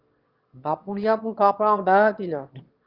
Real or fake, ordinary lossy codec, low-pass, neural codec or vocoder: fake; Opus, 24 kbps; 5.4 kHz; autoencoder, 22.05 kHz, a latent of 192 numbers a frame, VITS, trained on one speaker